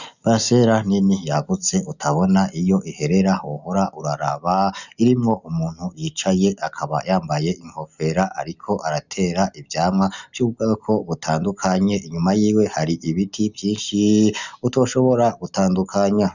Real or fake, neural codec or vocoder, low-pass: real; none; 7.2 kHz